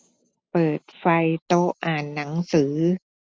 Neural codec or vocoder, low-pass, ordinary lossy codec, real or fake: none; none; none; real